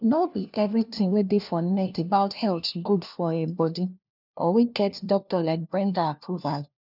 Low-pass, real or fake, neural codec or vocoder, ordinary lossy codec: 5.4 kHz; fake; codec, 16 kHz, 1 kbps, FunCodec, trained on LibriTTS, 50 frames a second; none